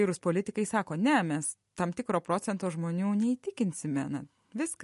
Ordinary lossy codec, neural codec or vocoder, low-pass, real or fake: MP3, 48 kbps; none; 14.4 kHz; real